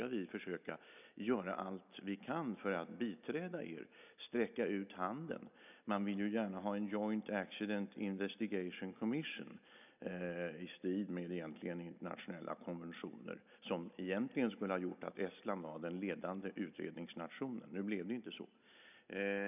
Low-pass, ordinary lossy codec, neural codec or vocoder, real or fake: 3.6 kHz; none; none; real